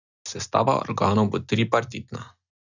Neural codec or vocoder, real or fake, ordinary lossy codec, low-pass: none; real; none; 7.2 kHz